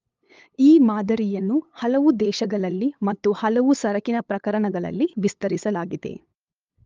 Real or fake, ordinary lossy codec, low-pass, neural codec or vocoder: fake; Opus, 32 kbps; 7.2 kHz; codec, 16 kHz, 8 kbps, FunCodec, trained on LibriTTS, 25 frames a second